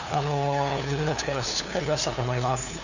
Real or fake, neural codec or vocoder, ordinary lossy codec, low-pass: fake; codec, 16 kHz, 2 kbps, FunCodec, trained on LibriTTS, 25 frames a second; none; 7.2 kHz